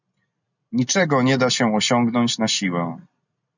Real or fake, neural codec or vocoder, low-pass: real; none; 7.2 kHz